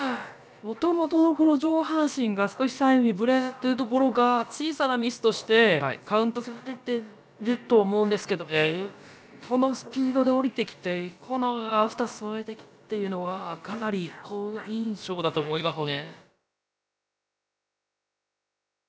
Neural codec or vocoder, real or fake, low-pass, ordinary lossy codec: codec, 16 kHz, about 1 kbps, DyCAST, with the encoder's durations; fake; none; none